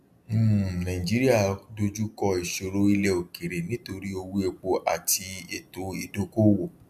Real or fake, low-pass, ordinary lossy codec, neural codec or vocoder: real; 14.4 kHz; none; none